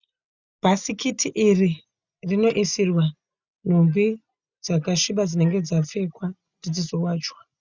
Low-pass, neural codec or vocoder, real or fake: 7.2 kHz; none; real